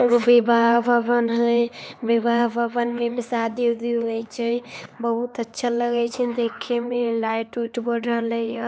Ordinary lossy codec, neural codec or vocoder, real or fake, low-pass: none; codec, 16 kHz, 4 kbps, X-Codec, HuBERT features, trained on LibriSpeech; fake; none